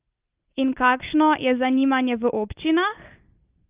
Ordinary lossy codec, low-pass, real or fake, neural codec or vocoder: Opus, 24 kbps; 3.6 kHz; real; none